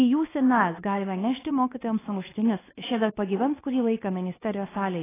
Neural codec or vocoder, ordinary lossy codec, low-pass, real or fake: codec, 24 kHz, 0.9 kbps, WavTokenizer, medium speech release version 2; AAC, 16 kbps; 3.6 kHz; fake